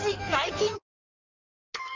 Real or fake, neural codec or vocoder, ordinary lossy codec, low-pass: fake; codec, 16 kHz in and 24 kHz out, 1.1 kbps, FireRedTTS-2 codec; none; 7.2 kHz